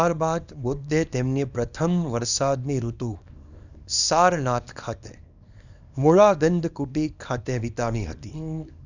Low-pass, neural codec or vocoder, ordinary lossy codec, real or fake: 7.2 kHz; codec, 24 kHz, 0.9 kbps, WavTokenizer, small release; none; fake